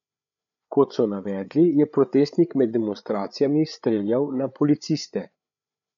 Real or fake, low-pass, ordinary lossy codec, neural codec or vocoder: fake; 7.2 kHz; none; codec, 16 kHz, 8 kbps, FreqCodec, larger model